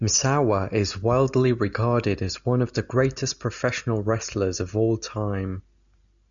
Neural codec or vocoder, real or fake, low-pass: none; real; 7.2 kHz